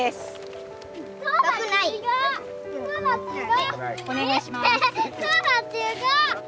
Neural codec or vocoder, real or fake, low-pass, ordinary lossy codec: none; real; none; none